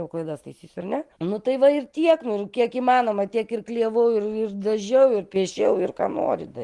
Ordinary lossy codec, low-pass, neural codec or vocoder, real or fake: Opus, 24 kbps; 10.8 kHz; none; real